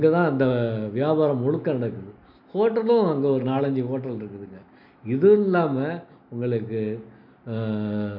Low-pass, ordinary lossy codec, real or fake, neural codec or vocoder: 5.4 kHz; none; real; none